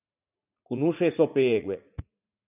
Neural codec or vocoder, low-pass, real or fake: vocoder, 44.1 kHz, 80 mel bands, Vocos; 3.6 kHz; fake